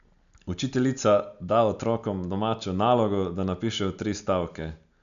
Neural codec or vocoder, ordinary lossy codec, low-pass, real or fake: none; none; 7.2 kHz; real